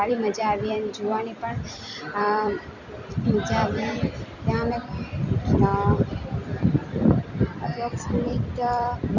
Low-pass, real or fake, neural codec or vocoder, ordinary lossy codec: 7.2 kHz; fake; vocoder, 44.1 kHz, 128 mel bands every 256 samples, BigVGAN v2; none